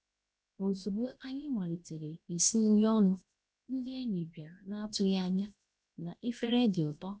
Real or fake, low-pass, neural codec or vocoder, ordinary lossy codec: fake; none; codec, 16 kHz, 0.7 kbps, FocalCodec; none